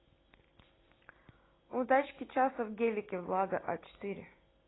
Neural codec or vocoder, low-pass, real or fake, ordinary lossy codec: none; 7.2 kHz; real; AAC, 16 kbps